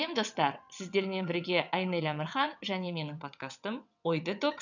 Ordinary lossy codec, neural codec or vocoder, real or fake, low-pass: none; vocoder, 22.05 kHz, 80 mel bands, WaveNeXt; fake; 7.2 kHz